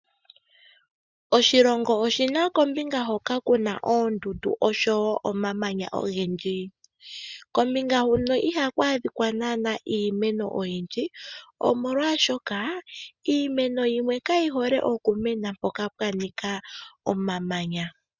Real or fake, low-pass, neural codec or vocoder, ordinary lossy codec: real; 7.2 kHz; none; Opus, 64 kbps